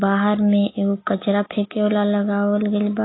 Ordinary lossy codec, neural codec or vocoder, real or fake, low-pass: AAC, 16 kbps; none; real; 7.2 kHz